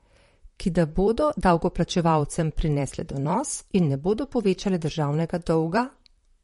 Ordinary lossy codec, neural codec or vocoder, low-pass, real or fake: MP3, 48 kbps; vocoder, 44.1 kHz, 128 mel bands, Pupu-Vocoder; 19.8 kHz; fake